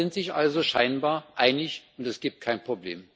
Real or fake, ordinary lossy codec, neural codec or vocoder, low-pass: real; none; none; none